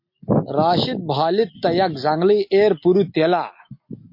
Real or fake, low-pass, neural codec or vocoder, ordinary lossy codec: real; 5.4 kHz; none; MP3, 32 kbps